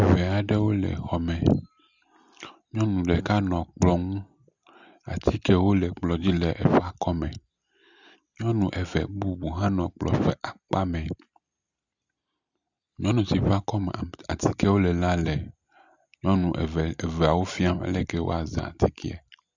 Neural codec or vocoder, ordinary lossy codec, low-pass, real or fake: none; Opus, 64 kbps; 7.2 kHz; real